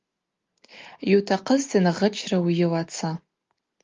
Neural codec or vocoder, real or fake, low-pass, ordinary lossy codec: none; real; 7.2 kHz; Opus, 32 kbps